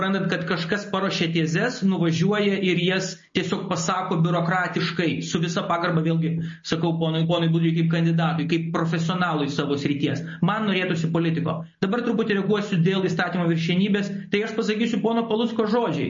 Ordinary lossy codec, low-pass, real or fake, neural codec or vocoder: MP3, 32 kbps; 7.2 kHz; real; none